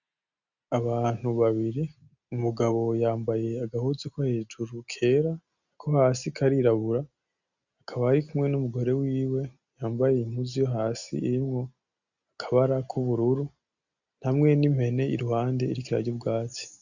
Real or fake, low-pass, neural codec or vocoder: real; 7.2 kHz; none